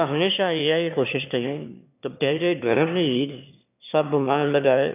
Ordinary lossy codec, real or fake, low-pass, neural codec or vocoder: none; fake; 3.6 kHz; autoencoder, 22.05 kHz, a latent of 192 numbers a frame, VITS, trained on one speaker